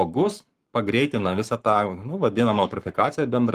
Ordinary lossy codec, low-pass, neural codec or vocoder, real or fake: Opus, 24 kbps; 14.4 kHz; codec, 44.1 kHz, 7.8 kbps, Pupu-Codec; fake